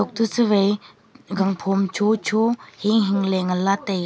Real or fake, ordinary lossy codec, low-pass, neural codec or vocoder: real; none; none; none